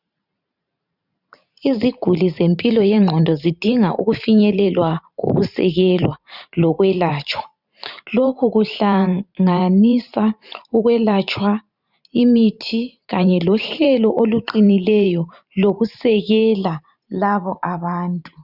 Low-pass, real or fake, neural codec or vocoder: 5.4 kHz; fake; vocoder, 44.1 kHz, 128 mel bands every 512 samples, BigVGAN v2